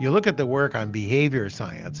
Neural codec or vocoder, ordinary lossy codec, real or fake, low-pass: none; Opus, 32 kbps; real; 7.2 kHz